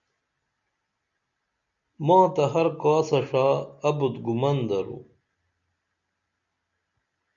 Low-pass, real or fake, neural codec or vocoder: 7.2 kHz; real; none